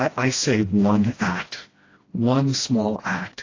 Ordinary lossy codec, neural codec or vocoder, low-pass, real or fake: AAC, 32 kbps; codec, 16 kHz, 1 kbps, FreqCodec, smaller model; 7.2 kHz; fake